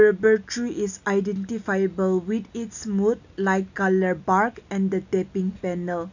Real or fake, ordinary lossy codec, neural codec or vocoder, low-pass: real; none; none; 7.2 kHz